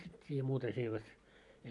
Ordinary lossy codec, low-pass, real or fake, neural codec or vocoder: none; 10.8 kHz; real; none